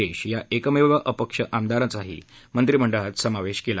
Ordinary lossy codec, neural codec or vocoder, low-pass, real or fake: none; none; none; real